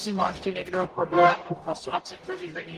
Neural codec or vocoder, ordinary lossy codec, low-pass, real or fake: codec, 44.1 kHz, 0.9 kbps, DAC; Opus, 24 kbps; 14.4 kHz; fake